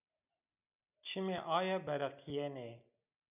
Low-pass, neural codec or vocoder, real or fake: 3.6 kHz; none; real